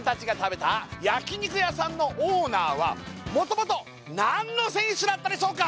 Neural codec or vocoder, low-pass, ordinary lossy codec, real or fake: none; none; none; real